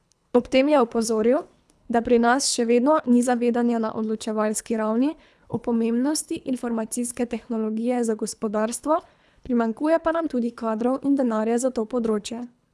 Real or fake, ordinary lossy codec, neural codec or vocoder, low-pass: fake; none; codec, 24 kHz, 3 kbps, HILCodec; none